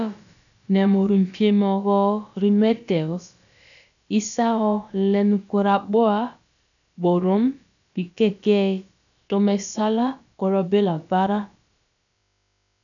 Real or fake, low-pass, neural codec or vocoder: fake; 7.2 kHz; codec, 16 kHz, about 1 kbps, DyCAST, with the encoder's durations